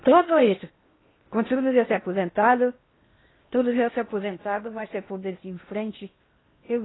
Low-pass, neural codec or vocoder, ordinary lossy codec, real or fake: 7.2 kHz; codec, 16 kHz in and 24 kHz out, 0.8 kbps, FocalCodec, streaming, 65536 codes; AAC, 16 kbps; fake